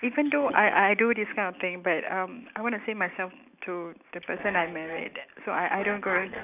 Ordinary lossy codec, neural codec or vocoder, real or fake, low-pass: none; none; real; 3.6 kHz